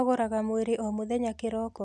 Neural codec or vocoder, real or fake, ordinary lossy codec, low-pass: none; real; none; none